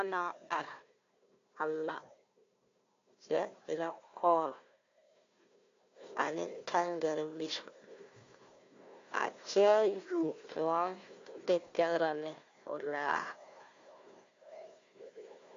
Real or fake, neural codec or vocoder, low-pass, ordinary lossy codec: fake; codec, 16 kHz, 1 kbps, FunCodec, trained on Chinese and English, 50 frames a second; 7.2 kHz; AAC, 48 kbps